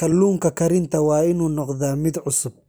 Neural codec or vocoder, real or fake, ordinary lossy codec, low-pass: none; real; none; none